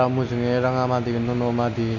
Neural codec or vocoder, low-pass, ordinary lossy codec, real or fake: none; 7.2 kHz; none; real